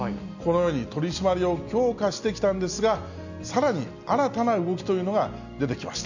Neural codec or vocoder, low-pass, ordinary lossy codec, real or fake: none; 7.2 kHz; none; real